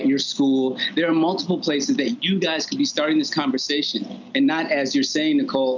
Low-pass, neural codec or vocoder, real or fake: 7.2 kHz; none; real